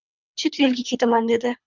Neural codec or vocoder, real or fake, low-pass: codec, 24 kHz, 3 kbps, HILCodec; fake; 7.2 kHz